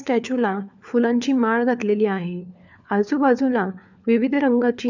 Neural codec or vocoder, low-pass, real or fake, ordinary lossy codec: codec, 16 kHz, 4 kbps, FunCodec, trained on LibriTTS, 50 frames a second; 7.2 kHz; fake; none